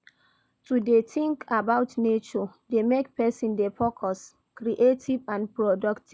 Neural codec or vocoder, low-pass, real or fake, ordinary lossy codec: none; none; real; none